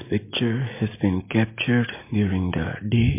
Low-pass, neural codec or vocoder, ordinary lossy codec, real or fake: 3.6 kHz; none; MP3, 24 kbps; real